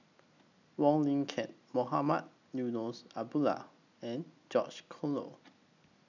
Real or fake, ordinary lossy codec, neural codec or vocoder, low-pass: real; none; none; 7.2 kHz